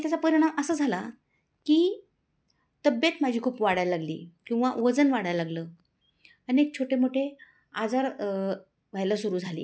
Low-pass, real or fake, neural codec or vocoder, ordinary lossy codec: none; real; none; none